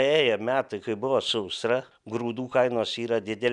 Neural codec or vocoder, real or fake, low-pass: none; real; 10.8 kHz